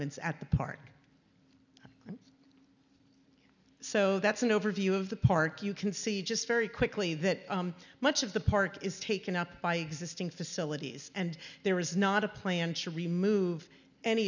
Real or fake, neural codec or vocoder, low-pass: real; none; 7.2 kHz